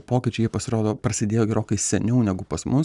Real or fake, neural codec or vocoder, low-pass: real; none; 10.8 kHz